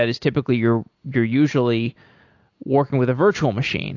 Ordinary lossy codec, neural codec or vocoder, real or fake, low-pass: AAC, 48 kbps; none; real; 7.2 kHz